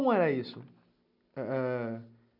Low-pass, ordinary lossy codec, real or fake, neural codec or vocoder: 5.4 kHz; none; real; none